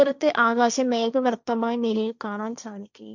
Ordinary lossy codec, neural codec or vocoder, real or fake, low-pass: none; codec, 16 kHz, 1.1 kbps, Voila-Tokenizer; fake; 7.2 kHz